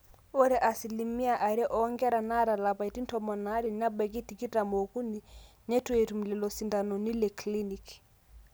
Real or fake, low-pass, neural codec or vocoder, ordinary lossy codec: real; none; none; none